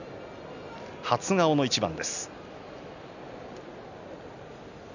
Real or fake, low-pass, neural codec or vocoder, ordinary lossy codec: real; 7.2 kHz; none; none